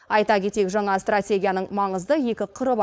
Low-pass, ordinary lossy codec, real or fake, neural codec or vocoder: none; none; fake; codec, 16 kHz, 4.8 kbps, FACodec